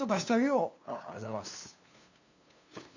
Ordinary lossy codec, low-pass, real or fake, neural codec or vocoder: none; 7.2 kHz; fake; codec, 16 kHz, 2 kbps, FunCodec, trained on LibriTTS, 25 frames a second